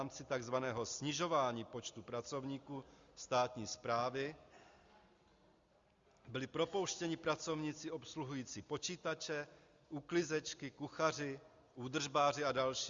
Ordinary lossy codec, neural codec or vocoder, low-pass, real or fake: Opus, 64 kbps; none; 7.2 kHz; real